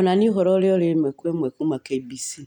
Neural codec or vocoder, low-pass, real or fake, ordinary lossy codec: none; 19.8 kHz; real; none